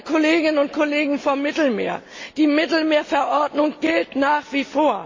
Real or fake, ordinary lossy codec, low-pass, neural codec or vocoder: real; MP3, 32 kbps; 7.2 kHz; none